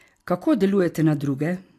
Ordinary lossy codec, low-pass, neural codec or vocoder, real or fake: AAC, 64 kbps; 14.4 kHz; none; real